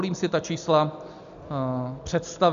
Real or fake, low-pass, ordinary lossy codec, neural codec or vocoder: real; 7.2 kHz; MP3, 64 kbps; none